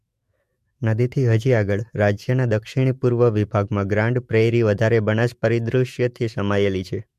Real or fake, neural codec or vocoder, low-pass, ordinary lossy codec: fake; autoencoder, 48 kHz, 128 numbers a frame, DAC-VAE, trained on Japanese speech; 14.4 kHz; MP3, 64 kbps